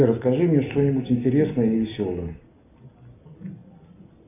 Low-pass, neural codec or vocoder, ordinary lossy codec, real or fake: 3.6 kHz; none; AAC, 32 kbps; real